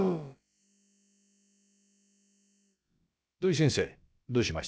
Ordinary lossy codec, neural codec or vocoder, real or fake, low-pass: none; codec, 16 kHz, about 1 kbps, DyCAST, with the encoder's durations; fake; none